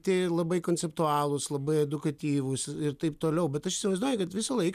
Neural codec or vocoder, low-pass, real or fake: none; 14.4 kHz; real